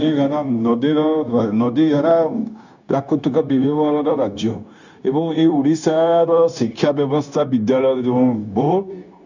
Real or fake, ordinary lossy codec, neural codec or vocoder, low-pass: fake; none; codec, 16 kHz, 0.9 kbps, LongCat-Audio-Codec; 7.2 kHz